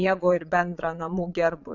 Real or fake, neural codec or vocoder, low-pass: fake; vocoder, 22.05 kHz, 80 mel bands, Vocos; 7.2 kHz